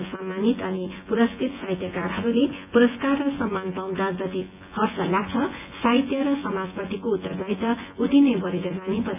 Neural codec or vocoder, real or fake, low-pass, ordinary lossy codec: vocoder, 24 kHz, 100 mel bands, Vocos; fake; 3.6 kHz; AAC, 32 kbps